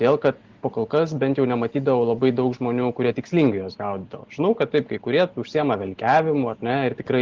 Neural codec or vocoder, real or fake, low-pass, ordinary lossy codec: none; real; 7.2 kHz; Opus, 16 kbps